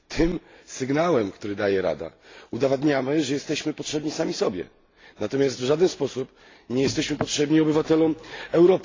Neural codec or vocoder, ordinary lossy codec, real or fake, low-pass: none; AAC, 32 kbps; real; 7.2 kHz